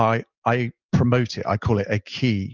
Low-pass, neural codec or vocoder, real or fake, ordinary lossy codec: 7.2 kHz; none; real; Opus, 32 kbps